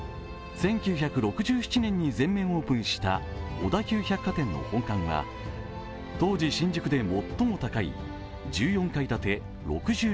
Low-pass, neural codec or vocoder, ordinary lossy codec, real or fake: none; none; none; real